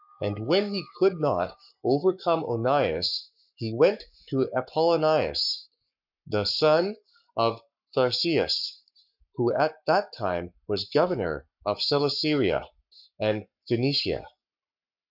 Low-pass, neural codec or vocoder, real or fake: 5.4 kHz; codec, 44.1 kHz, 7.8 kbps, Pupu-Codec; fake